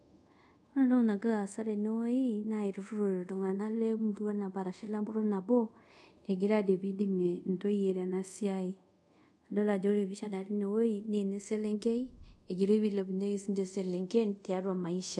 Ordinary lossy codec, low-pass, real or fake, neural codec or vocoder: none; none; fake; codec, 24 kHz, 0.5 kbps, DualCodec